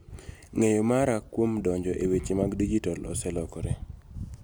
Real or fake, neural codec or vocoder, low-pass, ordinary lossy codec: real; none; none; none